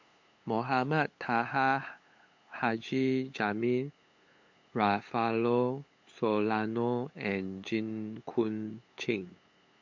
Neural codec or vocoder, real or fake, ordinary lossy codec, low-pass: codec, 16 kHz, 8 kbps, FunCodec, trained on LibriTTS, 25 frames a second; fake; MP3, 48 kbps; 7.2 kHz